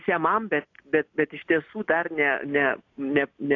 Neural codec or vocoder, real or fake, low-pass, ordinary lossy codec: none; real; 7.2 kHz; AAC, 48 kbps